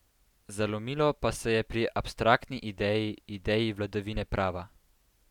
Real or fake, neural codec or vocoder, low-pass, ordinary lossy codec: real; none; 19.8 kHz; none